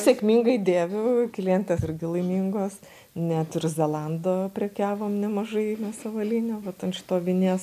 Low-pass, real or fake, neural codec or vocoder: 14.4 kHz; real; none